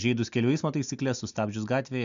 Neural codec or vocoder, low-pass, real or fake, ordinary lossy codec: none; 7.2 kHz; real; MP3, 64 kbps